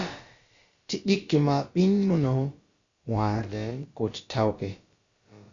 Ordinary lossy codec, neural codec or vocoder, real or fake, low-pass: Opus, 64 kbps; codec, 16 kHz, about 1 kbps, DyCAST, with the encoder's durations; fake; 7.2 kHz